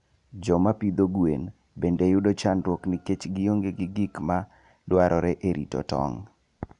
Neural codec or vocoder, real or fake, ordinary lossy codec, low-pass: none; real; none; 10.8 kHz